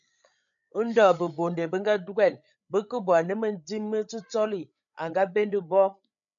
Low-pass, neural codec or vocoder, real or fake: 7.2 kHz; codec, 16 kHz, 8 kbps, FreqCodec, larger model; fake